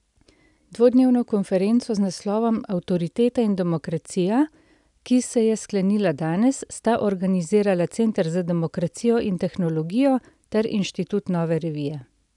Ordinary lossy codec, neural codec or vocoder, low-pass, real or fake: none; none; 10.8 kHz; real